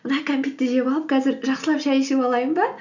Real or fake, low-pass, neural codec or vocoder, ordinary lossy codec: real; 7.2 kHz; none; none